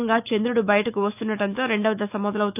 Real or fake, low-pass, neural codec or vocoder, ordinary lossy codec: fake; 3.6 kHz; autoencoder, 48 kHz, 128 numbers a frame, DAC-VAE, trained on Japanese speech; none